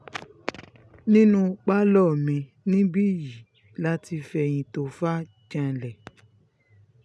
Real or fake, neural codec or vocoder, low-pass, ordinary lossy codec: real; none; none; none